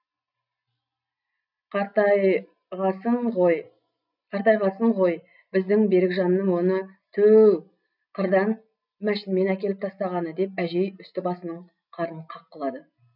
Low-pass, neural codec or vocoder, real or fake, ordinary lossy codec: 5.4 kHz; none; real; none